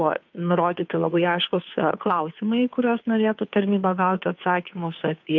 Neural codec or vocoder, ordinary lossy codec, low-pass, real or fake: codec, 16 kHz, 2 kbps, FunCodec, trained on Chinese and English, 25 frames a second; MP3, 48 kbps; 7.2 kHz; fake